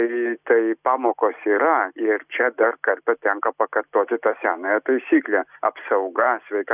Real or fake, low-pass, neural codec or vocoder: real; 3.6 kHz; none